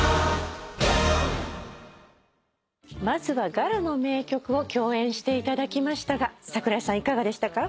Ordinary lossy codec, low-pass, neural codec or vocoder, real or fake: none; none; none; real